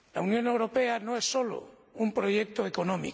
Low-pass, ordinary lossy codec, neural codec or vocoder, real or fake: none; none; none; real